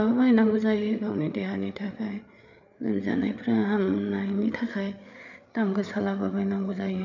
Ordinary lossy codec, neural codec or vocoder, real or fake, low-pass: none; codec, 16 kHz, 8 kbps, FreqCodec, larger model; fake; 7.2 kHz